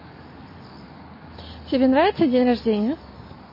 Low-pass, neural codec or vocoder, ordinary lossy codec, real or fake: 5.4 kHz; none; MP3, 24 kbps; real